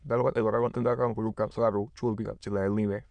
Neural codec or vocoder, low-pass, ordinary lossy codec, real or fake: autoencoder, 22.05 kHz, a latent of 192 numbers a frame, VITS, trained on many speakers; 9.9 kHz; none; fake